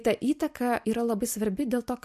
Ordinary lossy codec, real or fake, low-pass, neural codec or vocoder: MP3, 64 kbps; real; 14.4 kHz; none